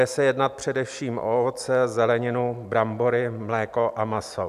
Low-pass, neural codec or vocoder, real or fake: 14.4 kHz; none; real